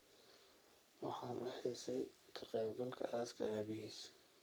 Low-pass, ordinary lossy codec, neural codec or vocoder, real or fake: none; none; codec, 44.1 kHz, 3.4 kbps, Pupu-Codec; fake